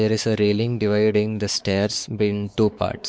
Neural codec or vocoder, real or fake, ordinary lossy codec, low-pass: codec, 16 kHz, 4 kbps, X-Codec, WavLM features, trained on Multilingual LibriSpeech; fake; none; none